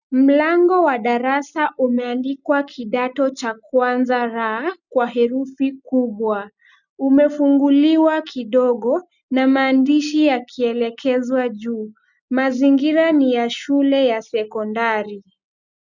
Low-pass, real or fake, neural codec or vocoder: 7.2 kHz; real; none